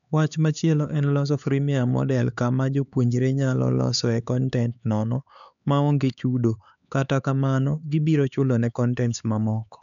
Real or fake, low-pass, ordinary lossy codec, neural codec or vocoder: fake; 7.2 kHz; none; codec, 16 kHz, 4 kbps, X-Codec, HuBERT features, trained on LibriSpeech